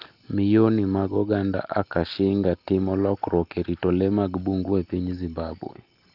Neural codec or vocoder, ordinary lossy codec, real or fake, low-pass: none; Opus, 32 kbps; real; 5.4 kHz